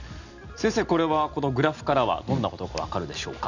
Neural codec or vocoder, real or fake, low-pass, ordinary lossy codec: none; real; 7.2 kHz; none